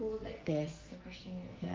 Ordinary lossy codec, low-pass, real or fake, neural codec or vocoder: Opus, 32 kbps; 7.2 kHz; fake; codec, 44.1 kHz, 2.6 kbps, SNAC